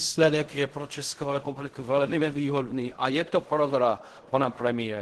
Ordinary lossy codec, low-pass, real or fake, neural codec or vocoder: Opus, 16 kbps; 10.8 kHz; fake; codec, 16 kHz in and 24 kHz out, 0.4 kbps, LongCat-Audio-Codec, fine tuned four codebook decoder